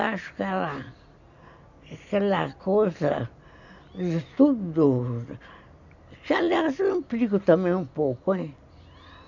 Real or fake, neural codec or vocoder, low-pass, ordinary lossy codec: real; none; 7.2 kHz; none